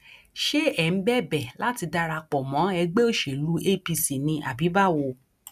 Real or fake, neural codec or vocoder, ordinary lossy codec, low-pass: real; none; none; 14.4 kHz